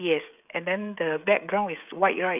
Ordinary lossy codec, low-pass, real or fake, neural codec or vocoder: none; 3.6 kHz; fake; codec, 16 kHz, 16 kbps, FreqCodec, smaller model